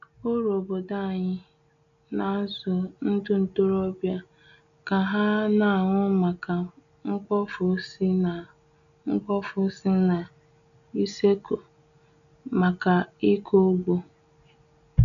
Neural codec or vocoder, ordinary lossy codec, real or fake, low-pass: none; none; real; 7.2 kHz